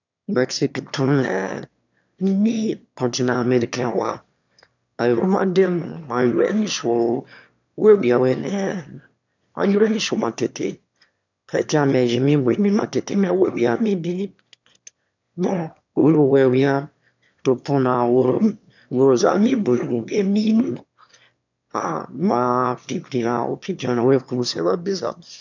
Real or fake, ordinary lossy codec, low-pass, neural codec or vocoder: fake; none; 7.2 kHz; autoencoder, 22.05 kHz, a latent of 192 numbers a frame, VITS, trained on one speaker